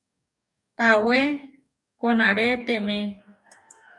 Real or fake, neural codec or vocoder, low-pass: fake; codec, 44.1 kHz, 2.6 kbps, DAC; 10.8 kHz